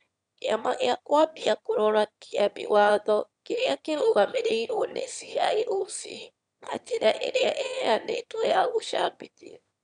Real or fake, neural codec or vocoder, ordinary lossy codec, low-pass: fake; autoencoder, 22.05 kHz, a latent of 192 numbers a frame, VITS, trained on one speaker; none; 9.9 kHz